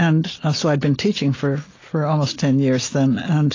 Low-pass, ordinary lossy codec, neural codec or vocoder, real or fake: 7.2 kHz; AAC, 32 kbps; none; real